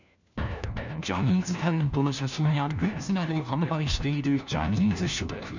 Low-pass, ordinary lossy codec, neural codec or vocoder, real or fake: 7.2 kHz; none; codec, 16 kHz, 1 kbps, FunCodec, trained on LibriTTS, 50 frames a second; fake